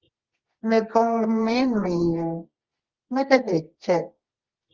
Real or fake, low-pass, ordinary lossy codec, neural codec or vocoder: fake; 7.2 kHz; Opus, 16 kbps; codec, 24 kHz, 0.9 kbps, WavTokenizer, medium music audio release